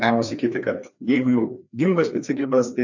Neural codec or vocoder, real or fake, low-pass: codec, 16 kHz, 2 kbps, FreqCodec, larger model; fake; 7.2 kHz